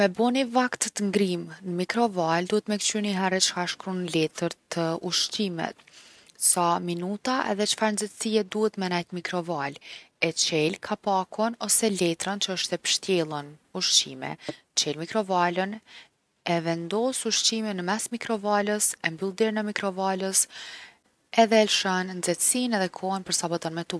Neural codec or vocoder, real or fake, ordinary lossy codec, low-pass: none; real; none; none